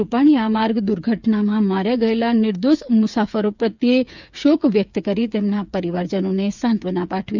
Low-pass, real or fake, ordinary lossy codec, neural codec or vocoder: 7.2 kHz; fake; none; codec, 16 kHz, 8 kbps, FreqCodec, smaller model